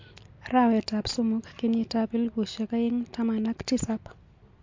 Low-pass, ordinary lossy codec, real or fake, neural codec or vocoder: 7.2 kHz; MP3, 48 kbps; real; none